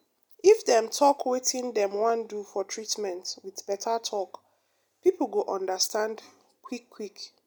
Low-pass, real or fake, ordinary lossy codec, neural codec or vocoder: none; real; none; none